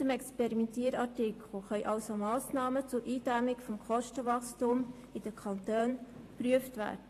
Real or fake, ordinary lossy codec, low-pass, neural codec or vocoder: fake; AAC, 48 kbps; 14.4 kHz; vocoder, 44.1 kHz, 128 mel bands every 512 samples, BigVGAN v2